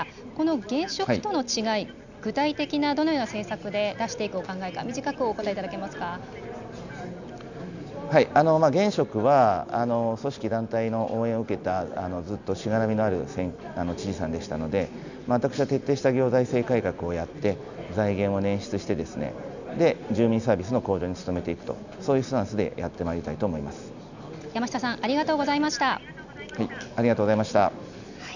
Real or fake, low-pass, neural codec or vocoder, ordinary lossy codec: real; 7.2 kHz; none; none